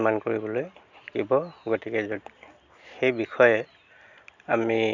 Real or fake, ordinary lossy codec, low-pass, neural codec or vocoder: real; none; 7.2 kHz; none